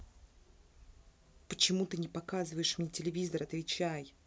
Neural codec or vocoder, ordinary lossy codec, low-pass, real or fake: none; none; none; real